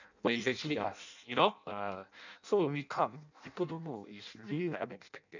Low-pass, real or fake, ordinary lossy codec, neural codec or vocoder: 7.2 kHz; fake; none; codec, 16 kHz in and 24 kHz out, 0.6 kbps, FireRedTTS-2 codec